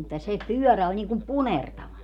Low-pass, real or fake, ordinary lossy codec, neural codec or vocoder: 19.8 kHz; real; none; none